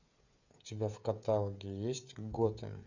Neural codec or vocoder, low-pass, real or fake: codec, 16 kHz, 16 kbps, FreqCodec, smaller model; 7.2 kHz; fake